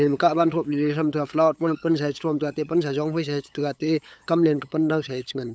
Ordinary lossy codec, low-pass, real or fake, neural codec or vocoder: none; none; fake; codec, 16 kHz, 8 kbps, FunCodec, trained on LibriTTS, 25 frames a second